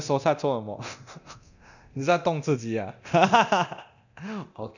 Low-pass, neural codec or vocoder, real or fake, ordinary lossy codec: 7.2 kHz; codec, 24 kHz, 0.9 kbps, DualCodec; fake; none